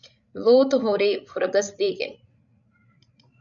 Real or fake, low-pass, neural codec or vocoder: fake; 7.2 kHz; codec, 16 kHz, 16 kbps, FreqCodec, larger model